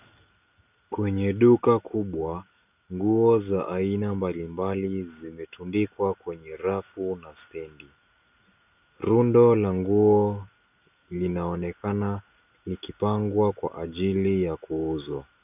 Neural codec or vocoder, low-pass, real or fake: none; 3.6 kHz; real